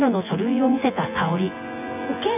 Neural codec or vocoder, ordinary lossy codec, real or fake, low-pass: vocoder, 24 kHz, 100 mel bands, Vocos; none; fake; 3.6 kHz